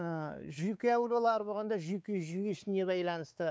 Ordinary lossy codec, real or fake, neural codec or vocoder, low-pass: none; fake; codec, 16 kHz, 4 kbps, X-Codec, WavLM features, trained on Multilingual LibriSpeech; none